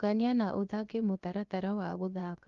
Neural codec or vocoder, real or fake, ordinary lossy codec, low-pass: codec, 16 kHz, 0.7 kbps, FocalCodec; fake; Opus, 32 kbps; 7.2 kHz